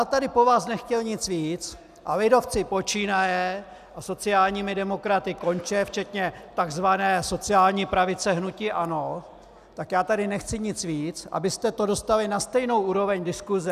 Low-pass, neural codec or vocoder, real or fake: 14.4 kHz; none; real